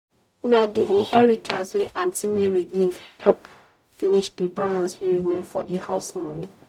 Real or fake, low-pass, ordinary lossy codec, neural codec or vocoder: fake; 19.8 kHz; none; codec, 44.1 kHz, 0.9 kbps, DAC